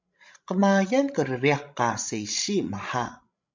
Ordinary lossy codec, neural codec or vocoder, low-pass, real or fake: MP3, 64 kbps; codec, 16 kHz, 16 kbps, FreqCodec, larger model; 7.2 kHz; fake